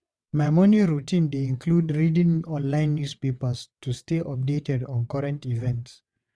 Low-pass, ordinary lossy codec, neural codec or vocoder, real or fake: none; none; vocoder, 22.05 kHz, 80 mel bands, WaveNeXt; fake